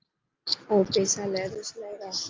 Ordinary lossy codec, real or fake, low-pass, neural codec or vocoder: Opus, 24 kbps; real; 7.2 kHz; none